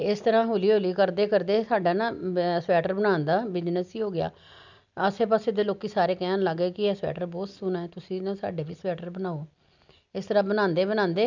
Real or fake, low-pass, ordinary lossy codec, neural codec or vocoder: real; 7.2 kHz; none; none